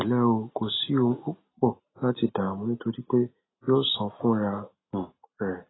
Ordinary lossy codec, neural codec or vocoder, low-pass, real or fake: AAC, 16 kbps; none; 7.2 kHz; real